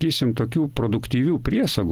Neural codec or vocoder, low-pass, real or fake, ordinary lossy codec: none; 19.8 kHz; real; Opus, 16 kbps